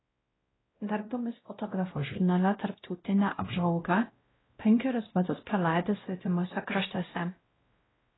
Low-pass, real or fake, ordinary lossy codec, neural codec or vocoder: 7.2 kHz; fake; AAC, 16 kbps; codec, 16 kHz, 0.5 kbps, X-Codec, WavLM features, trained on Multilingual LibriSpeech